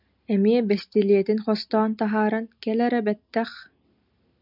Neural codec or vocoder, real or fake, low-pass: none; real; 5.4 kHz